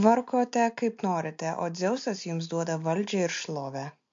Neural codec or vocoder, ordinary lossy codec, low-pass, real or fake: none; MP3, 48 kbps; 7.2 kHz; real